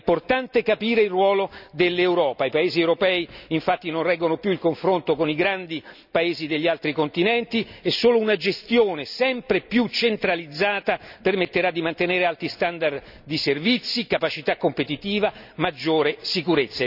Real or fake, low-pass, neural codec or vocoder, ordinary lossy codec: real; 5.4 kHz; none; none